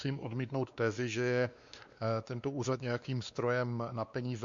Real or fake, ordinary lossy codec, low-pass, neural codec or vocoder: fake; Opus, 64 kbps; 7.2 kHz; codec, 16 kHz, 2 kbps, X-Codec, WavLM features, trained on Multilingual LibriSpeech